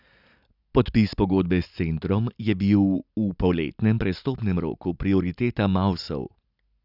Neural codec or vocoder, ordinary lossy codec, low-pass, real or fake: none; none; 5.4 kHz; real